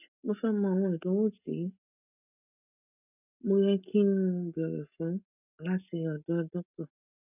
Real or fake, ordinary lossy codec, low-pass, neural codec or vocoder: real; none; 3.6 kHz; none